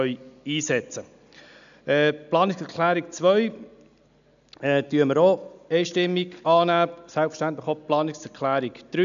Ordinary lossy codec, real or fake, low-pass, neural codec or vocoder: none; real; 7.2 kHz; none